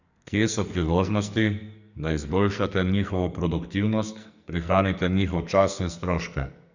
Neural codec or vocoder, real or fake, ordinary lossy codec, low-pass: codec, 44.1 kHz, 2.6 kbps, SNAC; fake; none; 7.2 kHz